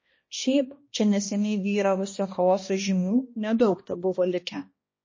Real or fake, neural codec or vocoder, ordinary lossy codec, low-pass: fake; codec, 16 kHz, 1 kbps, X-Codec, HuBERT features, trained on balanced general audio; MP3, 32 kbps; 7.2 kHz